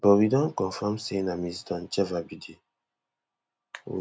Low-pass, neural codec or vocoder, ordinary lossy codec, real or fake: none; none; none; real